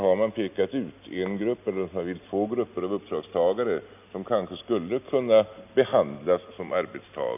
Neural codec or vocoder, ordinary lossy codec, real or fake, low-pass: none; none; real; 3.6 kHz